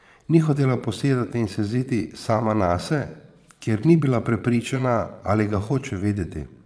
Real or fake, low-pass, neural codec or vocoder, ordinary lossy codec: fake; none; vocoder, 22.05 kHz, 80 mel bands, Vocos; none